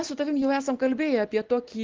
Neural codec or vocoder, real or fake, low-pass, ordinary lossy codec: none; real; 7.2 kHz; Opus, 16 kbps